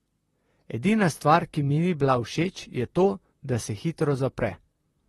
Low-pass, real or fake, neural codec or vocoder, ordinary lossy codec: 19.8 kHz; fake; vocoder, 44.1 kHz, 128 mel bands, Pupu-Vocoder; AAC, 32 kbps